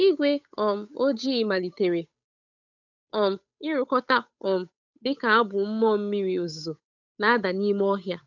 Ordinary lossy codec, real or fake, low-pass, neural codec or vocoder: none; fake; 7.2 kHz; codec, 16 kHz, 8 kbps, FunCodec, trained on Chinese and English, 25 frames a second